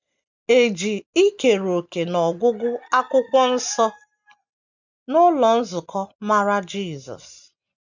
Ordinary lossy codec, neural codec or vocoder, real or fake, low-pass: none; none; real; 7.2 kHz